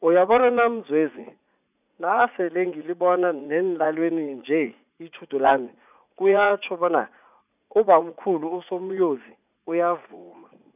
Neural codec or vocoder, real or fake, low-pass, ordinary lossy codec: vocoder, 44.1 kHz, 80 mel bands, Vocos; fake; 3.6 kHz; none